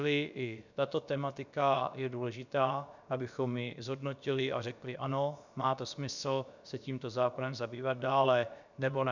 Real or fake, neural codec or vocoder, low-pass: fake; codec, 16 kHz, about 1 kbps, DyCAST, with the encoder's durations; 7.2 kHz